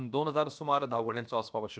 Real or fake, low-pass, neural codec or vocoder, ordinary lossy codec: fake; none; codec, 16 kHz, about 1 kbps, DyCAST, with the encoder's durations; none